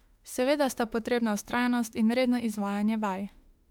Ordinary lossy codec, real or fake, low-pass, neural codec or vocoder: MP3, 96 kbps; fake; 19.8 kHz; autoencoder, 48 kHz, 32 numbers a frame, DAC-VAE, trained on Japanese speech